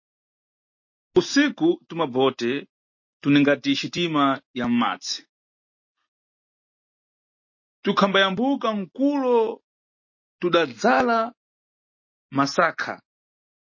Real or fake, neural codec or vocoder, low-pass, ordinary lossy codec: real; none; 7.2 kHz; MP3, 32 kbps